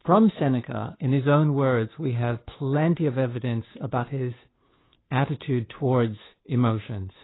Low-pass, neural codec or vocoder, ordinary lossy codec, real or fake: 7.2 kHz; codec, 16 kHz, 2 kbps, FunCodec, trained on Chinese and English, 25 frames a second; AAC, 16 kbps; fake